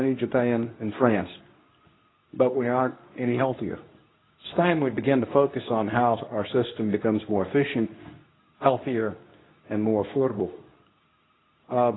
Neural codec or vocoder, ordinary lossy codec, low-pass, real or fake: codec, 24 kHz, 0.9 kbps, WavTokenizer, medium speech release version 2; AAC, 16 kbps; 7.2 kHz; fake